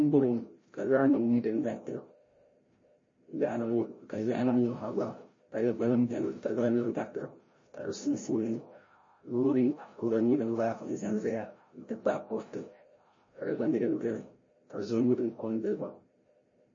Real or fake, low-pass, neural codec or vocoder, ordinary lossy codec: fake; 7.2 kHz; codec, 16 kHz, 0.5 kbps, FreqCodec, larger model; MP3, 32 kbps